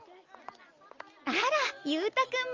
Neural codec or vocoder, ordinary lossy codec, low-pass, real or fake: none; Opus, 32 kbps; 7.2 kHz; real